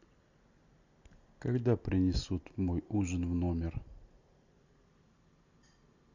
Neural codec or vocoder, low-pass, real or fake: none; 7.2 kHz; real